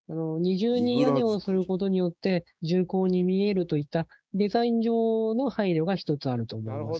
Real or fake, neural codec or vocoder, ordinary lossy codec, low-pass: fake; codec, 16 kHz, 6 kbps, DAC; none; none